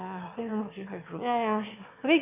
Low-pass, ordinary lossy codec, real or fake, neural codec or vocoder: 3.6 kHz; none; fake; autoencoder, 22.05 kHz, a latent of 192 numbers a frame, VITS, trained on one speaker